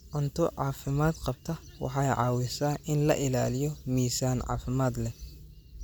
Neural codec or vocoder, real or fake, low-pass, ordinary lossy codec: none; real; none; none